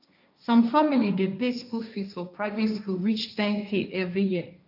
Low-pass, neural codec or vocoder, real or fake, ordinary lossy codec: 5.4 kHz; codec, 16 kHz, 1.1 kbps, Voila-Tokenizer; fake; none